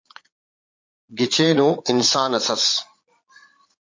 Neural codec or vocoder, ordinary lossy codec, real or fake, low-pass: vocoder, 22.05 kHz, 80 mel bands, Vocos; MP3, 48 kbps; fake; 7.2 kHz